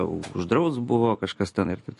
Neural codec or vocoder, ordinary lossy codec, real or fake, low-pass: none; MP3, 48 kbps; real; 14.4 kHz